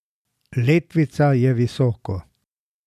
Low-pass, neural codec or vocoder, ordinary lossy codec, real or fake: 14.4 kHz; none; none; real